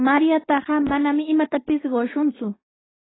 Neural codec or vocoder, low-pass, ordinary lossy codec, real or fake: codec, 16 kHz, 6 kbps, DAC; 7.2 kHz; AAC, 16 kbps; fake